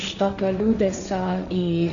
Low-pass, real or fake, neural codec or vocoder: 7.2 kHz; fake; codec, 16 kHz, 1.1 kbps, Voila-Tokenizer